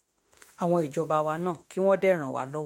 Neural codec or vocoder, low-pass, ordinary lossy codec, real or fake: autoencoder, 48 kHz, 32 numbers a frame, DAC-VAE, trained on Japanese speech; 19.8 kHz; MP3, 64 kbps; fake